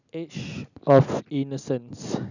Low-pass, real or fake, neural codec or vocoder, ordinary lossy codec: 7.2 kHz; real; none; none